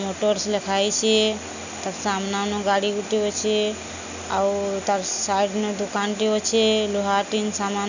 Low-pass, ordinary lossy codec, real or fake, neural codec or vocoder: 7.2 kHz; none; real; none